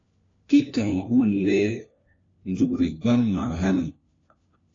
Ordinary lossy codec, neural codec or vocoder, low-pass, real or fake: AAC, 32 kbps; codec, 16 kHz, 1 kbps, FunCodec, trained on LibriTTS, 50 frames a second; 7.2 kHz; fake